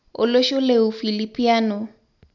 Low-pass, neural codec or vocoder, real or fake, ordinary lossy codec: 7.2 kHz; none; real; none